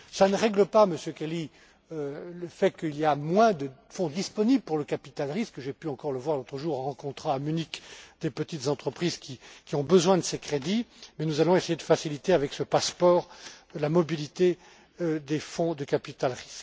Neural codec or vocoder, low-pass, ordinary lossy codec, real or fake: none; none; none; real